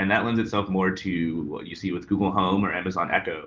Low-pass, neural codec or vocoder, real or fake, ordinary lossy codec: 7.2 kHz; none; real; Opus, 32 kbps